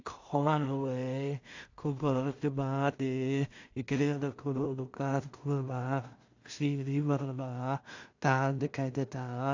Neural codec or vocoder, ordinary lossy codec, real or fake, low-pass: codec, 16 kHz in and 24 kHz out, 0.4 kbps, LongCat-Audio-Codec, two codebook decoder; AAC, 48 kbps; fake; 7.2 kHz